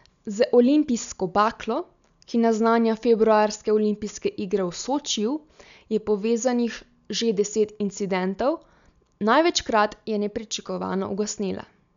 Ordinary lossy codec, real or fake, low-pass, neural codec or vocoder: none; real; 7.2 kHz; none